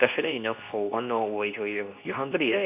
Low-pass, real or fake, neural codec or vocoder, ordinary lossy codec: 3.6 kHz; fake; codec, 24 kHz, 0.9 kbps, WavTokenizer, medium speech release version 2; AAC, 32 kbps